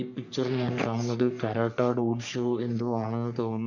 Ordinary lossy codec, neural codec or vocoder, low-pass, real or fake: none; codec, 44.1 kHz, 3.4 kbps, Pupu-Codec; 7.2 kHz; fake